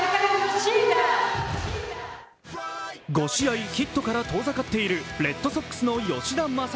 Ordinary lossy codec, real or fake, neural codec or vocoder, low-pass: none; real; none; none